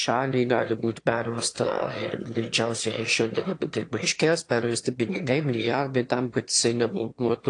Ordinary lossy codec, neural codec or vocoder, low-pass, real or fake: AAC, 48 kbps; autoencoder, 22.05 kHz, a latent of 192 numbers a frame, VITS, trained on one speaker; 9.9 kHz; fake